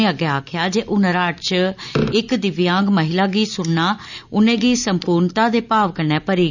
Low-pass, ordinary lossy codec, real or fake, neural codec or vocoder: 7.2 kHz; none; real; none